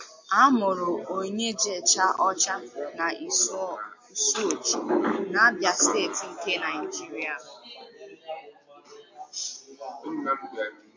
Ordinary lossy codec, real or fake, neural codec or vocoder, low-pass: MP3, 48 kbps; real; none; 7.2 kHz